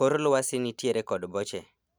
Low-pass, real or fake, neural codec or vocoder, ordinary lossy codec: none; real; none; none